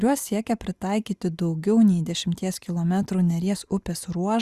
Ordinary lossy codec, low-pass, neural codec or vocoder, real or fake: Opus, 64 kbps; 14.4 kHz; none; real